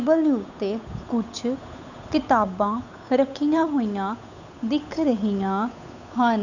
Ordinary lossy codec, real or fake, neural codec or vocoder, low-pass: none; fake; codec, 16 kHz, 8 kbps, FunCodec, trained on Chinese and English, 25 frames a second; 7.2 kHz